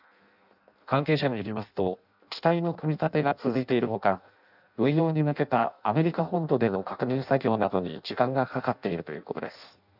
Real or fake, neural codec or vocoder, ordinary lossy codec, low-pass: fake; codec, 16 kHz in and 24 kHz out, 0.6 kbps, FireRedTTS-2 codec; none; 5.4 kHz